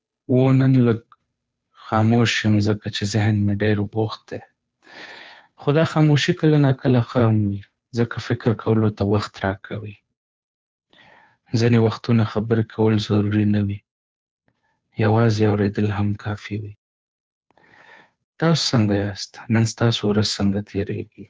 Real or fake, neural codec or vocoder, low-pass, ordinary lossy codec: fake; codec, 16 kHz, 2 kbps, FunCodec, trained on Chinese and English, 25 frames a second; none; none